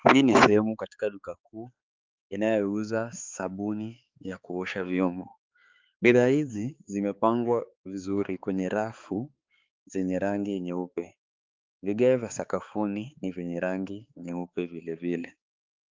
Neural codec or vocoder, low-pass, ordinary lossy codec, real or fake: codec, 16 kHz, 4 kbps, X-Codec, HuBERT features, trained on balanced general audio; 7.2 kHz; Opus, 32 kbps; fake